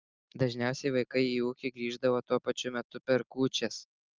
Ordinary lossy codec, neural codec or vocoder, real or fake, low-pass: Opus, 32 kbps; none; real; 7.2 kHz